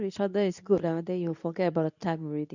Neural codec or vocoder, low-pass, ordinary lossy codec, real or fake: codec, 24 kHz, 0.9 kbps, WavTokenizer, medium speech release version 2; 7.2 kHz; none; fake